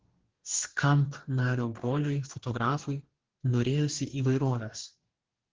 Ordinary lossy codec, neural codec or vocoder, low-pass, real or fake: Opus, 16 kbps; codec, 44.1 kHz, 2.6 kbps, DAC; 7.2 kHz; fake